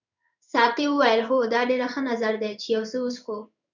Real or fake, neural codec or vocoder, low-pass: fake; codec, 16 kHz in and 24 kHz out, 1 kbps, XY-Tokenizer; 7.2 kHz